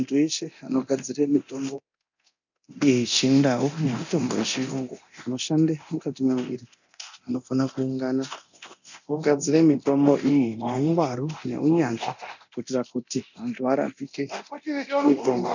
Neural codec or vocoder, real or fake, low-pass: codec, 24 kHz, 0.9 kbps, DualCodec; fake; 7.2 kHz